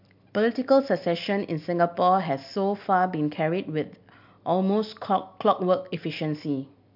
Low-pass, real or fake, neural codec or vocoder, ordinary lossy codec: 5.4 kHz; real; none; MP3, 48 kbps